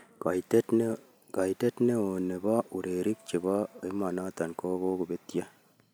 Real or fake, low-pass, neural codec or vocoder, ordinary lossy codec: real; none; none; none